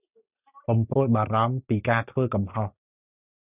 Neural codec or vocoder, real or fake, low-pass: none; real; 3.6 kHz